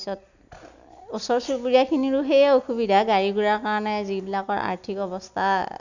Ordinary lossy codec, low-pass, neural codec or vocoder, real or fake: none; 7.2 kHz; none; real